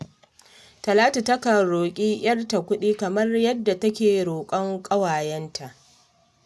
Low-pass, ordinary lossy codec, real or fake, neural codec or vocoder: none; none; real; none